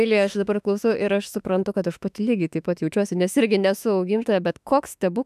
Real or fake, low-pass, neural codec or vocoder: fake; 14.4 kHz; autoencoder, 48 kHz, 32 numbers a frame, DAC-VAE, trained on Japanese speech